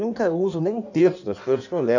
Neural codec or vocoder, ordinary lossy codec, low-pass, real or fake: codec, 16 kHz in and 24 kHz out, 1.1 kbps, FireRedTTS-2 codec; none; 7.2 kHz; fake